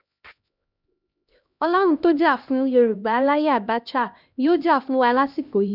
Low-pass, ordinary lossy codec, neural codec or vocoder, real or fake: 5.4 kHz; none; codec, 16 kHz, 1 kbps, X-Codec, HuBERT features, trained on LibriSpeech; fake